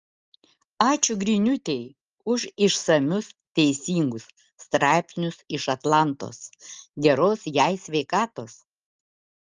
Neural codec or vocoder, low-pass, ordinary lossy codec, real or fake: none; 7.2 kHz; Opus, 32 kbps; real